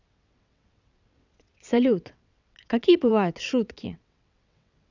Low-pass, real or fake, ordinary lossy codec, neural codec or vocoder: 7.2 kHz; fake; none; vocoder, 22.05 kHz, 80 mel bands, WaveNeXt